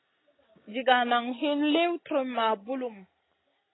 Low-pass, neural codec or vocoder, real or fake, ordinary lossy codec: 7.2 kHz; none; real; AAC, 16 kbps